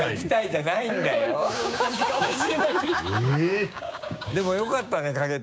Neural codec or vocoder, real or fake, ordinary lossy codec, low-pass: codec, 16 kHz, 6 kbps, DAC; fake; none; none